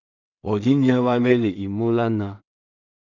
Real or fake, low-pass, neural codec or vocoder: fake; 7.2 kHz; codec, 16 kHz in and 24 kHz out, 0.4 kbps, LongCat-Audio-Codec, two codebook decoder